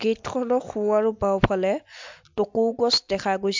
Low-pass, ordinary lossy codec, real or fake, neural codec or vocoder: 7.2 kHz; MP3, 64 kbps; real; none